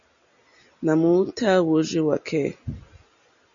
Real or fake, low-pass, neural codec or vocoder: real; 7.2 kHz; none